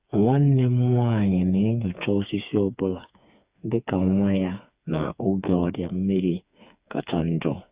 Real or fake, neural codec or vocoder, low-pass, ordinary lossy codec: fake; codec, 16 kHz, 4 kbps, FreqCodec, smaller model; 3.6 kHz; Opus, 64 kbps